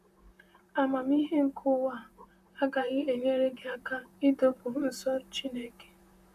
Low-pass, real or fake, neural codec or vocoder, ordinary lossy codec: 14.4 kHz; fake; vocoder, 44.1 kHz, 128 mel bands, Pupu-Vocoder; none